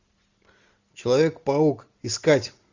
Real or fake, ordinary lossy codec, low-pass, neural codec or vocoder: real; Opus, 64 kbps; 7.2 kHz; none